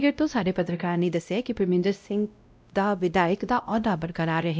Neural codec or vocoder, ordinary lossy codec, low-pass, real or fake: codec, 16 kHz, 0.5 kbps, X-Codec, WavLM features, trained on Multilingual LibriSpeech; none; none; fake